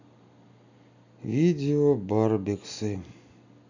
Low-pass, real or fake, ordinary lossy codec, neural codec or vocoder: 7.2 kHz; real; none; none